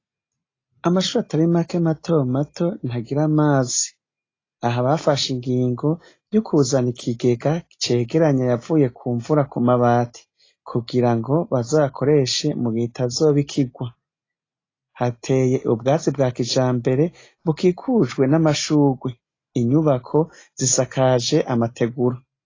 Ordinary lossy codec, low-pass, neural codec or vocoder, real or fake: AAC, 32 kbps; 7.2 kHz; none; real